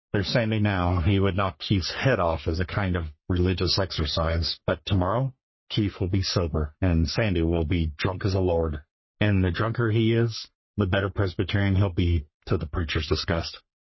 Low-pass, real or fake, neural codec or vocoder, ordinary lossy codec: 7.2 kHz; fake; codec, 44.1 kHz, 3.4 kbps, Pupu-Codec; MP3, 24 kbps